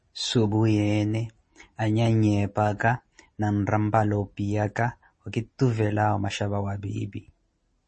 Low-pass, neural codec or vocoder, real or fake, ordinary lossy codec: 10.8 kHz; none; real; MP3, 32 kbps